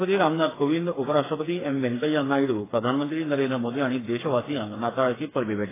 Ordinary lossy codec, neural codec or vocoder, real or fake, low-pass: AAC, 16 kbps; codec, 16 kHz, 4 kbps, FreqCodec, smaller model; fake; 3.6 kHz